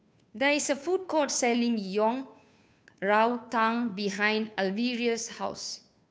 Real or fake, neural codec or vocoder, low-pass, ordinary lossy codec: fake; codec, 16 kHz, 2 kbps, FunCodec, trained on Chinese and English, 25 frames a second; none; none